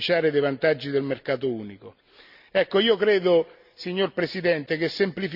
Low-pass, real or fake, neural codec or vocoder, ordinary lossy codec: 5.4 kHz; real; none; Opus, 64 kbps